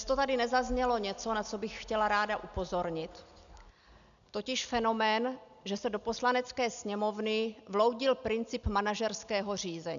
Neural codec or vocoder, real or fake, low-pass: none; real; 7.2 kHz